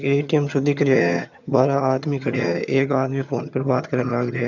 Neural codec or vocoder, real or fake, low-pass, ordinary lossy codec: vocoder, 22.05 kHz, 80 mel bands, HiFi-GAN; fake; 7.2 kHz; none